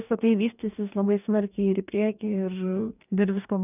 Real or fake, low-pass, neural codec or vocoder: fake; 3.6 kHz; codec, 44.1 kHz, 2.6 kbps, DAC